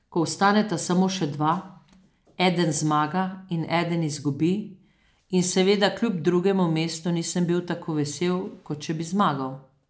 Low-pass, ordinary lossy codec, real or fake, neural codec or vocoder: none; none; real; none